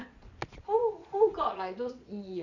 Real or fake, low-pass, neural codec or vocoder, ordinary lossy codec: fake; 7.2 kHz; vocoder, 22.05 kHz, 80 mel bands, Vocos; none